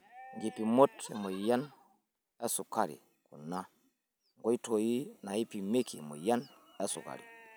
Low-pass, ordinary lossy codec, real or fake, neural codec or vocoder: none; none; real; none